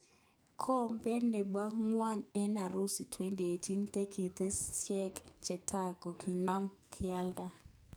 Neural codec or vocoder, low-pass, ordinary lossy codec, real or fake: codec, 44.1 kHz, 2.6 kbps, SNAC; none; none; fake